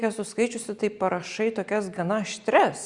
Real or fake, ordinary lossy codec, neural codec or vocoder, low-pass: real; Opus, 64 kbps; none; 10.8 kHz